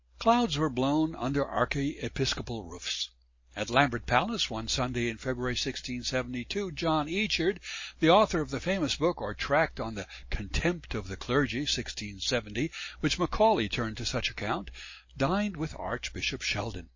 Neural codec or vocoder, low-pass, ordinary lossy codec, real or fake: none; 7.2 kHz; MP3, 32 kbps; real